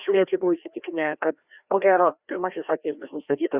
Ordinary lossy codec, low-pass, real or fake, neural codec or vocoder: Opus, 64 kbps; 3.6 kHz; fake; codec, 16 kHz, 1 kbps, FreqCodec, larger model